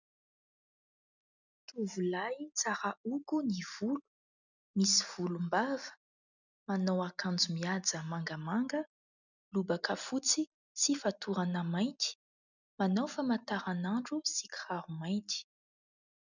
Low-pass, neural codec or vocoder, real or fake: 7.2 kHz; none; real